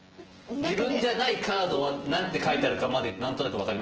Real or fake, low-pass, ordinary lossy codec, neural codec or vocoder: fake; 7.2 kHz; Opus, 16 kbps; vocoder, 24 kHz, 100 mel bands, Vocos